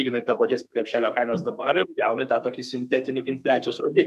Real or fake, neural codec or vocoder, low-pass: fake; codec, 32 kHz, 1.9 kbps, SNAC; 14.4 kHz